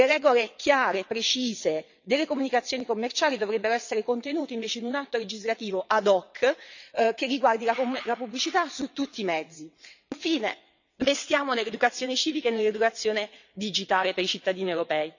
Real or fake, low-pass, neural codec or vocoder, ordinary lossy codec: fake; 7.2 kHz; vocoder, 22.05 kHz, 80 mel bands, WaveNeXt; none